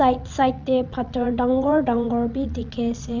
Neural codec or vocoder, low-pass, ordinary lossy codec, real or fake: vocoder, 22.05 kHz, 80 mel bands, WaveNeXt; 7.2 kHz; none; fake